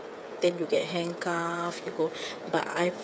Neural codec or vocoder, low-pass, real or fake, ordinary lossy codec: codec, 16 kHz, 8 kbps, FreqCodec, smaller model; none; fake; none